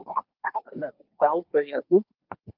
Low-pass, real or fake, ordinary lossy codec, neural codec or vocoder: 5.4 kHz; fake; Opus, 24 kbps; codec, 24 kHz, 1 kbps, SNAC